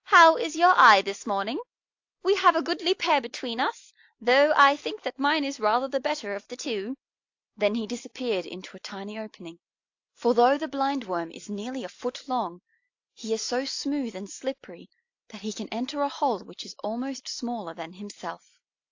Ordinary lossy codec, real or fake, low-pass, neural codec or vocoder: AAC, 48 kbps; real; 7.2 kHz; none